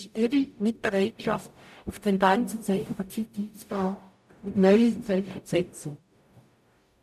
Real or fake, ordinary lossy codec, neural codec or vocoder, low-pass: fake; none; codec, 44.1 kHz, 0.9 kbps, DAC; 14.4 kHz